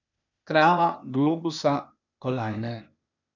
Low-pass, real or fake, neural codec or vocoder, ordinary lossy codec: 7.2 kHz; fake; codec, 16 kHz, 0.8 kbps, ZipCodec; none